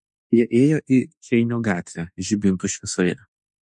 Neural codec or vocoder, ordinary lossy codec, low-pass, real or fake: autoencoder, 48 kHz, 32 numbers a frame, DAC-VAE, trained on Japanese speech; MP3, 48 kbps; 10.8 kHz; fake